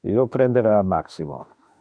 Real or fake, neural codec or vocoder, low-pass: fake; autoencoder, 48 kHz, 32 numbers a frame, DAC-VAE, trained on Japanese speech; 9.9 kHz